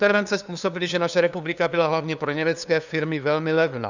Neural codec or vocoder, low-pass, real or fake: codec, 16 kHz, 2 kbps, FunCodec, trained on LibriTTS, 25 frames a second; 7.2 kHz; fake